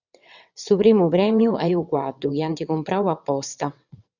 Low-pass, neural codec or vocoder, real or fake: 7.2 kHz; vocoder, 22.05 kHz, 80 mel bands, WaveNeXt; fake